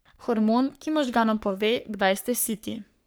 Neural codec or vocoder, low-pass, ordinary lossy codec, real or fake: codec, 44.1 kHz, 3.4 kbps, Pupu-Codec; none; none; fake